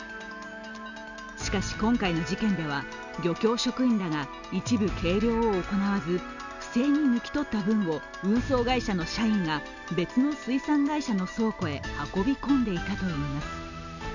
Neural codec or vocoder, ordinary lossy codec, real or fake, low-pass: none; none; real; 7.2 kHz